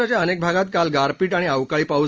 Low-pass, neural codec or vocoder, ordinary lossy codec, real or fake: 7.2 kHz; none; Opus, 24 kbps; real